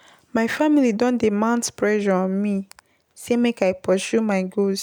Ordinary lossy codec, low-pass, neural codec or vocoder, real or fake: none; none; none; real